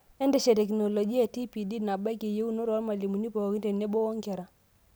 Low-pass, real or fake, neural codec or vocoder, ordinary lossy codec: none; real; none; none